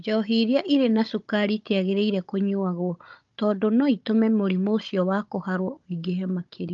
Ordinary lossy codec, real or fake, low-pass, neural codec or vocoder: Opus, 24 kbps; fake; 7.2 kHz; codec, 16 kHz, 16 kbps, FunCodec, trained on Chinese and English, 50 frames a second